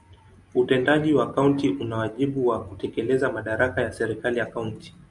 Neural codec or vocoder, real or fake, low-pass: none; real; 10.8 kHz